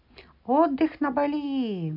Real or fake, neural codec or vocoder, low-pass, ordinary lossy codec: real; none; 5.4 kHz; none